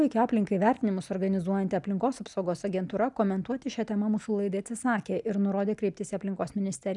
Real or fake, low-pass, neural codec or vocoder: real; 10.8 kHz; none